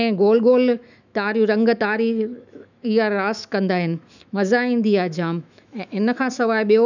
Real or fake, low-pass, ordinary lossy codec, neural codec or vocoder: fake; 7.2 kHz; none; autoencoder, 48 kHz, 128 numbers a frame, DAC-VAE, trained on Japanese speech